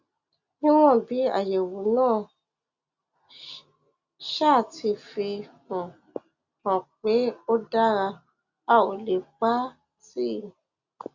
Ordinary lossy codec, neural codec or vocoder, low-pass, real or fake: Opus, 64 kbps; none; 7.2 kHz; real